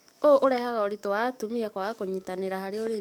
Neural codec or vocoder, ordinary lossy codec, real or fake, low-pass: codec, 44.1 kHz, 7.8 kbps, DAC; none; fake; 19.8 kHz